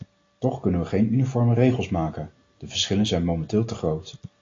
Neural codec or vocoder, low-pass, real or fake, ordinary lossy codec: none; 7.2 kHz; real; AAC, 32 kbps